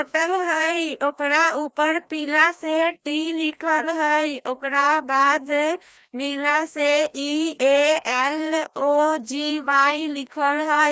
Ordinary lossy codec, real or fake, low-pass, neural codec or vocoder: none; fake; none; codec, 16 kHz, 1 kbps, FreqCodec, larger model